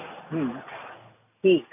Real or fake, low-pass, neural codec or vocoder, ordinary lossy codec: real; 3.6 kHz; none; none